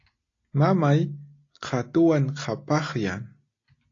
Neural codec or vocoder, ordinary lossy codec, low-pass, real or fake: none; AAC, 32 kbps; 7.2 kHz; real